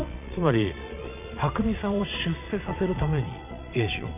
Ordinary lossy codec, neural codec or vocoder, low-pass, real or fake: none; vocoder, 22.05 kHz, 80 mel bands, Vocos; 3.6 kHz; fake